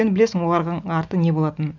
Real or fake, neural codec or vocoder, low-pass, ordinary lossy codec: real; none; 7.2 kHz; none